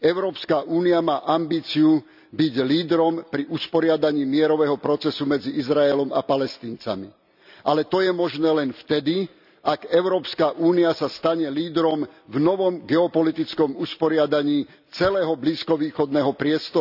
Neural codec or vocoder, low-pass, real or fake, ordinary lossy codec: none; 5.4 kHz; real; none